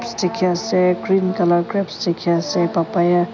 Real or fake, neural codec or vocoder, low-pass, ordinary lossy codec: real; none; 7.2 kHz; none